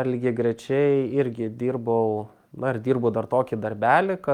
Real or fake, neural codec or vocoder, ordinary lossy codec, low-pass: real; none; Opus, 32 kbps; 19.8 kHz